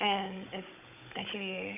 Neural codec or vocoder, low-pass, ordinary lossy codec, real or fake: codec, 16 kHz, 16 kbps, FunCodec, trained on Chinese and English, 50 frames a second; 3.6 kHz; none; fake